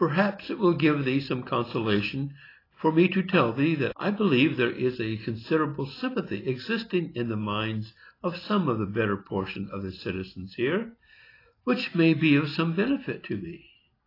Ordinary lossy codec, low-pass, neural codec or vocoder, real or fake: AAC, 24 kbps; 5.4 kHz; none; real